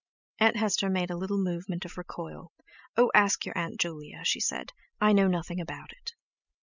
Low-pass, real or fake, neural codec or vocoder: 7.2 kHz; real; none